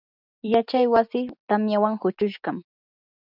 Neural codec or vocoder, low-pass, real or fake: none; 5.4 kHz; real